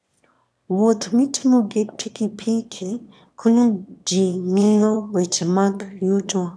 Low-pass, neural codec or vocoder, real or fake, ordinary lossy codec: none; autoencoder, 22.05 kHz, a latent of 192 numbers a frame, VITS, trained on one speaker; fake; none